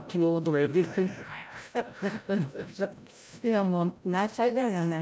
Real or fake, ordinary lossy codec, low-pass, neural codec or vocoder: fake; none; none; codec, 16 kHz, 0.5 kbps, FreqCodec, larger model